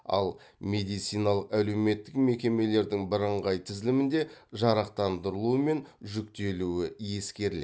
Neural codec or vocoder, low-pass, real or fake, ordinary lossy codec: none; none; real; none